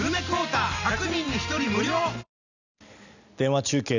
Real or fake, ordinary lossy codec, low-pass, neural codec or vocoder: real; none; 7.2 kHz; none